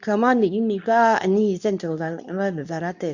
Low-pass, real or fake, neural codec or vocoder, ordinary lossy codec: 7.2 kHz; fake; codec, 24 kHz, 0.9 kbps, WavTokenizer, medium speech release version 2; none